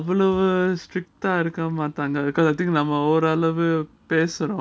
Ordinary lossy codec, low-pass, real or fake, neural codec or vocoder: none; none; real; none